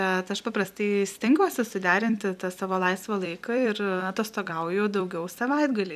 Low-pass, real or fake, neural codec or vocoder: 14.4 kHz; fake; vocoder, 44.1 kHz, 128 mel bands every 256 samples, BigVGAN v2